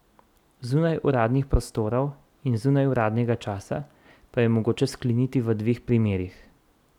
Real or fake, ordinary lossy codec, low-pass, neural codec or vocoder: real; none; 19.8 kHz; none